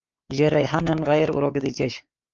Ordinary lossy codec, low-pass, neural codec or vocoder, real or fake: Opus, 24 kbps; 7.2 kHz; codec, 16 kHz, 4 kbps, X-Codec, WavLM features, trained on Multilingual LibriSpeech; fake